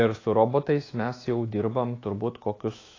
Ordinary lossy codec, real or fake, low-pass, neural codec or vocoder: AAC, 32 kbps; fake; 7.2 kHz; autoencoder, 48 kHz, 128 numbers a frame, DAC-VAE, trained on Japanese speech